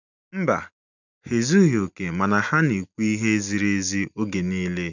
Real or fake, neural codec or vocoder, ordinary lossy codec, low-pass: real; none; none; 7.2 kHz